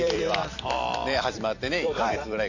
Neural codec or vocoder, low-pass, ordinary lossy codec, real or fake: vocoder, 44.1 kHz, 128 mel bands every 256 samples, BigVGAN v2; 7.2 kHz; none; fake